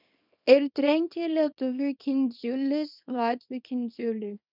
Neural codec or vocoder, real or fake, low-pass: codec, 24 kHz, 0.9 kbps, WavTokenizer, small release; fake; 5.4 kHz